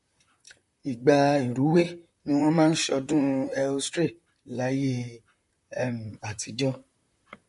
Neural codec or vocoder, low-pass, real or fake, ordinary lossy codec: vocoder, 44.1 kHz, 128 mel bands, Pupu-Vocoder; 14.4 kHz; fake; MP3, 48 kbps